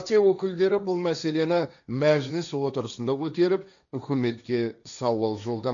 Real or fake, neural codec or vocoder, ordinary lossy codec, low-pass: fake; codec, 16 kHz, 1.1 kbps, Voila-Tokenizer; none; 7.2 kHz